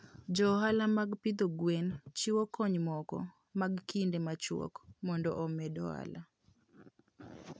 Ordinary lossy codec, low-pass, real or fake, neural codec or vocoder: none; none; real; none